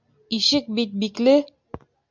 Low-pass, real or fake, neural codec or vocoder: 7.2 kHz; real; none